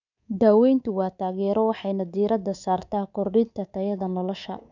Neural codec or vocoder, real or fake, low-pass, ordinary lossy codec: codec, 24 kHz, 3.1 kbps, DualCodec; fake; 7.2 kHz; none